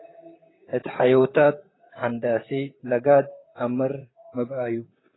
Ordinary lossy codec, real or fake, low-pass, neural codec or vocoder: AAC, 16 kbps; fake; 7.2 kHz; vocoder, 44.1 kHz, 128 mel bands, Pupu-Vocoder